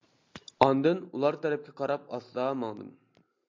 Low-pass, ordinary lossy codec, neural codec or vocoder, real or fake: 7.2 kHz; MP3, 64 kbps; none; real